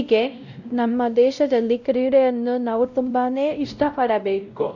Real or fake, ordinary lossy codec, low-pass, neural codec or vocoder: fake; none; 7.2 kHz; codec, 16 kHz, 0.5 kbps, X-Codec, WavLM features, trained on Multilingual LibriSpeech